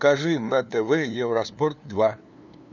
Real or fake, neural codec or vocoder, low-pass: fake; codec, 16 kHz, 2 kbps, FunCodec, trained on LibriTTS, 25 frames a second; 7.2 kHz